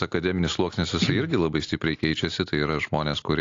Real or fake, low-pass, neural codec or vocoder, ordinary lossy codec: real; 7.2 kHz; none; AAC, 48 kbps